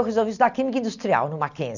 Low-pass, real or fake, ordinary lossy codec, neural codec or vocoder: 7.2 kHz; real; none; none